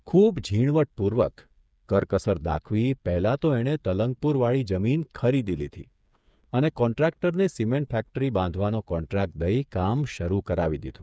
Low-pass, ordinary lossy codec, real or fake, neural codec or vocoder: none; none; fake; codec, 16 kHz, 8 kbps, FreqCodec, smaller model